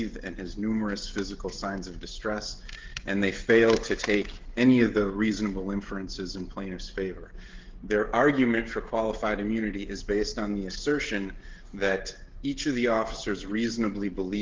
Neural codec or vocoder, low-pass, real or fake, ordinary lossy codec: codec, 16 kHz, 16 kbps, FreqCodec, smaller model; 7.2 kHz; fake; Opus, 16 kbps